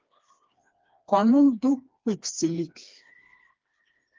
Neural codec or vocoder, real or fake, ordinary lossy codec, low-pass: codec, 16 kHz, 2 kbps, FreqCodec, smaller model; fake; Opus, 32 kbps; 7.2 kHz